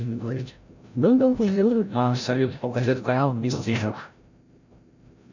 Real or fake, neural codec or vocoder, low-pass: fake; codec, 16 kHz, 0.5 kbps, FreqCodec, larger model; 7.2 kHz